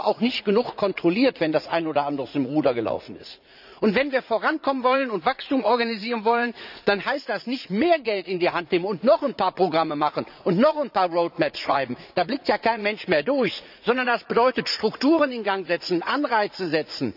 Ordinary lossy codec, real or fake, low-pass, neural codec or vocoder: none; fake; 5.4 kHz; vocoder, 44.1 kHz, 80 mel bands, Vocos